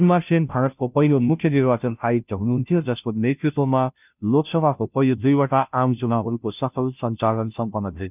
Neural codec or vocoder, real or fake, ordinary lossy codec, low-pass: codec, 16 kHz, 0.5 kbps, FunCodec, trained on Chinese and English, 25 frames a second; fake; none; 3.6 kHz